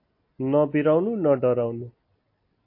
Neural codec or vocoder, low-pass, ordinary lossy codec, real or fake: none; 5.4 kHz; MP3, 32 kbps; real